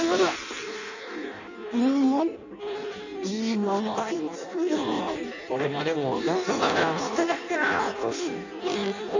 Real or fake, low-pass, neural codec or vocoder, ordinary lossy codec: fake; 7.2 kHz; codec, 16 kHz in and 24 kHz out, 0.6 kbps, FireRedTTS-2 codec; none